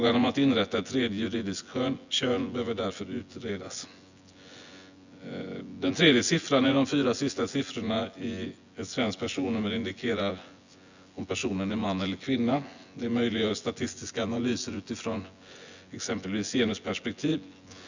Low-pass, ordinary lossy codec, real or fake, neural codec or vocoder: 7.2 kHz; Opus, 64 kbps; fake; vocoder, 24 kHz, 100 mel bands, Vocos